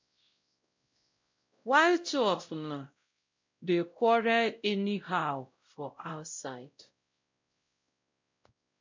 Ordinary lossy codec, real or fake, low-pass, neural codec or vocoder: MP3, 64 kbps; fake; 7.2 kHz; codec, 16 kHz, 0.5 kbps, X-Codec, WavLM features, trained on Multilingual LibriSpeech